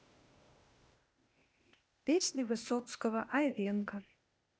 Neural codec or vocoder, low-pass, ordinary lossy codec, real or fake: codec, 16 kHz, 0.8 kbps, ZipCodec; none; none; fake